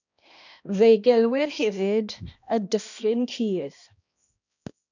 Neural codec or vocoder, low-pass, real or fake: codec, 16 kHz, 1 kbps, X-Codec, HuBERT features, trained on balanced general audio; 7.2 kHz; fake